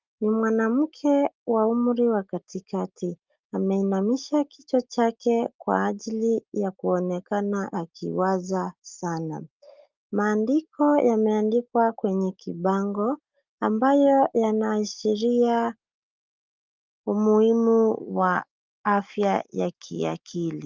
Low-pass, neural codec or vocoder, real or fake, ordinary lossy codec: 7.2 kHz; none; real; Opus, 24 kbps